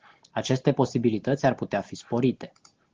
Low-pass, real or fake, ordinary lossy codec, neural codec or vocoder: 7.2 kHz; real; Opus, 16 kbps; none